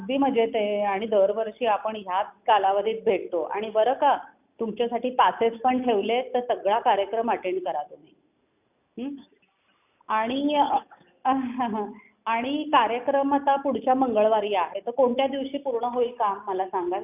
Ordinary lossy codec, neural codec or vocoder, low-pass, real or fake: none; none; 3.6 kHz; real